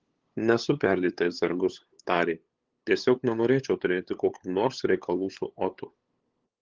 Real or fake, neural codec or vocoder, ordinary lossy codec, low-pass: fake; codec, 16 kHz, 8 kbps, FunCodec, trained on LibriTTS, 25 frames a second; Opus, 16 kbps; 7.2 kHz